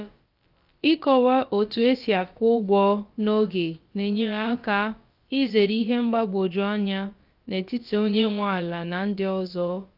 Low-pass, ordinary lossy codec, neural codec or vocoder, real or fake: 5.4 kHz; Opus, 32 kbps; codec, 16 kHz, about 1 kbps, DyCAST, with the encoder's durations; fake